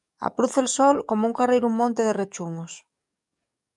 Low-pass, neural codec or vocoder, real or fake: 10.8 kHz; codec, 44.1 kHz, 7.8 kbps, DAC; fake